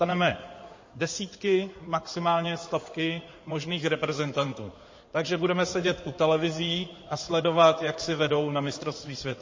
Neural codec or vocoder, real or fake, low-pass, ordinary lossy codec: codec, 16 kHz in and 24 kHz out, 2.2 kbps, FireRedTTS-2 codec; fake; 7.2 kHz; MP3, 32 kbps